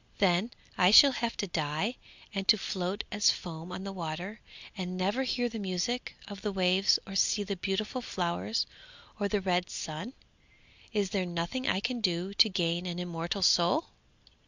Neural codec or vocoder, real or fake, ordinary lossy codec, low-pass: none; real; Opus, 64 kbps; 7.2 kHz